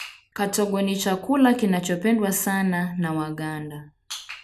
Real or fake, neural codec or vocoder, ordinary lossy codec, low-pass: real; none; none; 14.4 kHz